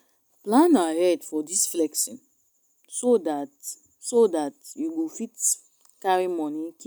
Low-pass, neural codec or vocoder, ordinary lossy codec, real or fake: none; none; none; real